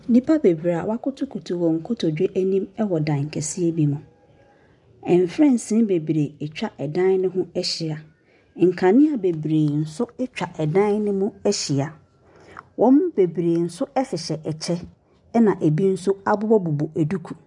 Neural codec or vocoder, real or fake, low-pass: none; real; 10.8 kHz